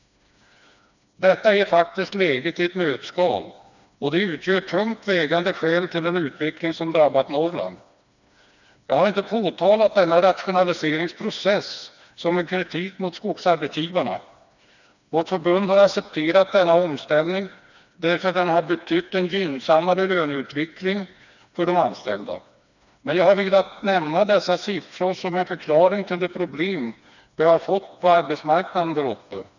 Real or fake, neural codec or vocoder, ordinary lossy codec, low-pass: fake; codec, 16 kHz, 2 kbps, FreqCodec, smaller model; none; 7.2 kHz